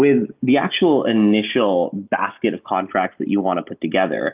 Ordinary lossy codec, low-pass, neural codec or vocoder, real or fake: Opus, 24 kbps; 3.6 kHz; none; real